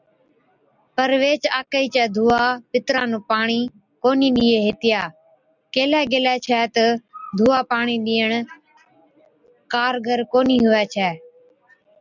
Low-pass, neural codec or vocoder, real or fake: 7.2 kHz; none; real